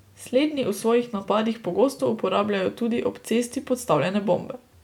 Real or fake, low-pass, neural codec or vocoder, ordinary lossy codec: real; 19.8 kHz; none; none